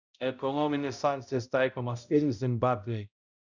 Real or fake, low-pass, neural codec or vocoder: fake; 7.2 kHz; codec, 16 kHz, 0.5 kbps, X-Codec, HuBERT features, trained on balanced general audio